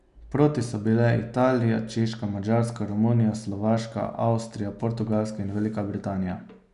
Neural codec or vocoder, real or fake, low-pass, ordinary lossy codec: none; real; 10.8 kHz; none